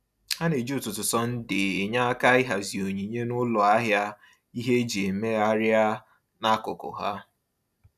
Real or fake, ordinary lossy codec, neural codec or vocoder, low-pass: real; none; none; 14.4 kHz